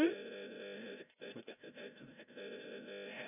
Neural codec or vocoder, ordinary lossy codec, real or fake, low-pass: codec, 16 kHz, 0.5 kbps, FreqCodec, larger model; none; fake; 3.6 kHz